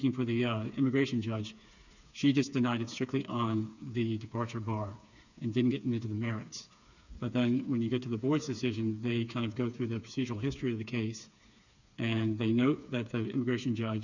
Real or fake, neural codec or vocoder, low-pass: fake; codec, 16 kHz, 4 kbps, FreqCodec, smaller model; 7.2 kHz